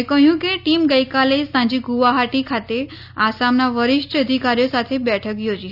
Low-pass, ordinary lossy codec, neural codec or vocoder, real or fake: 5.4 kHz; none; none; real